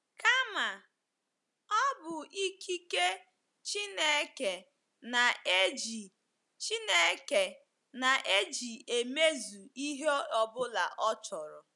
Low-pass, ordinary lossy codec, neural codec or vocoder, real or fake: 10.8 kHz; none; none; real